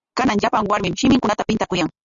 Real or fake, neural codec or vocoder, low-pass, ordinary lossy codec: real; none; 7.2 kHz; Opus, 64 kbps